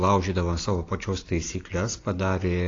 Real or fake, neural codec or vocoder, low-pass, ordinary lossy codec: real; none; 7.2 kHz; AAC, 32 kbps